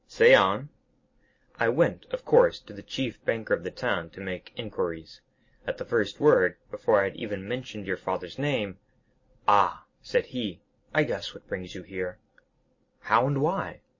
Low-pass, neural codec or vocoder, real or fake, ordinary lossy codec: 7.2 kHz; none; real; MP3, 32 kbps